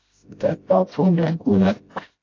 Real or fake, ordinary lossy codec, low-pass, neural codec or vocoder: fake; AAC, 32 kbps; 7.2 kHz; codec, 16 kHz, 0.5 kbps, FreqCodec, smaller model